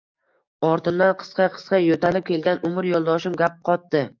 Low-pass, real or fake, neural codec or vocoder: 7.2 kHz; fake; codec, 44.1 kHz, 7.8 kbps, DAC